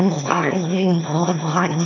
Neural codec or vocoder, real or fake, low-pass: autoencoder, 22.05 kHz, a latent of 192 numbers a frame, VITS, trained on one speaker; fake; 7.2 kHz